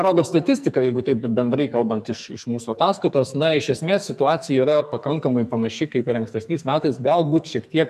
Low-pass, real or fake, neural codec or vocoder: 14.4 kHz; fake; codec, 44.1 kHz, 2.6 kbps, SNAC